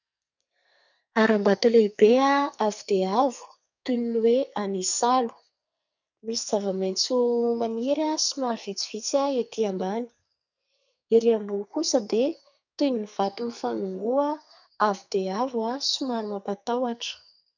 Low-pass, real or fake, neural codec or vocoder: 7.2 kHz; fake; codec, 44.1 kHz, 2.6 kbps, SNAC